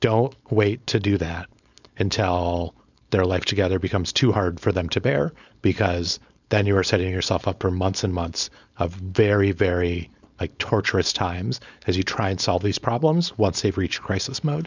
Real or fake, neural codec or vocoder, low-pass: fake; codec, 16 kHz, 4.8 kbps, FACodec; 7.2 kHz